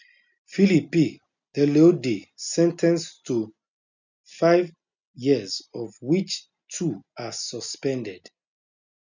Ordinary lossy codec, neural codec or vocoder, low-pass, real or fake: none; none; 7.2 kHz; real